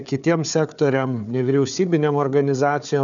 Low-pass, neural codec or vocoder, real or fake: 7.2 kHz; codec, 16 kHz, 4 kbps, FunCodec, trained on Chinese and English, 50 frames a second; fake